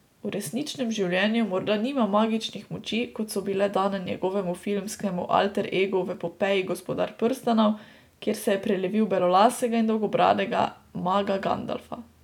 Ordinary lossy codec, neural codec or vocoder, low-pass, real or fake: none; none; 19.8 kHz; real